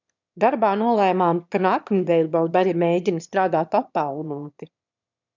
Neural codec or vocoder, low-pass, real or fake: autoencoder, 22.05 kHz, a latent of 192 numbers a frame, VITS, trained on one speaker; 7.2 kHz; fake